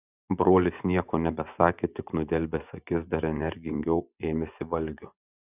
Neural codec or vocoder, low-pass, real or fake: vocoder, 24 kHz, 100 mel bands, Vocos; 3.6 kHz; fake